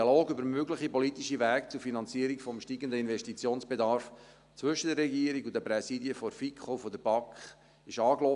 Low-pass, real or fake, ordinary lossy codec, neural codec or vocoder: 10.8 kHz; real; none; none